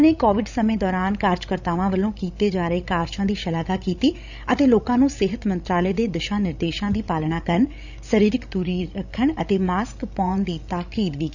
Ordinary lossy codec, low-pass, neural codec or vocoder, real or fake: none; 7.2 kHz; codec, 16 kHz, 16 kbps, FreqCodec, larger model; fake